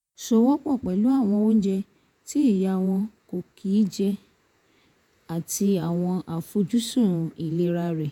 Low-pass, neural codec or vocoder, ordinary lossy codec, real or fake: 19.8 kHz; vocoder, 48 kHz, 128 mel bands, Vocos; none; fake